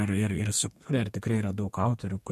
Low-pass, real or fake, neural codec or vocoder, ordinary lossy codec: 14.4 kHz; fake; codec, 32 kHz, 1.9 kbps, SNAC; MP3, 64 kbps